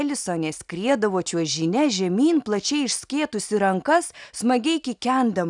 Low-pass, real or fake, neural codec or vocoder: 10.8 kHz; real; none